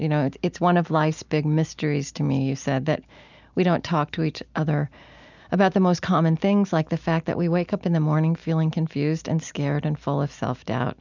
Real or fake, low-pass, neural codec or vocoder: real; 7.2 kHz; none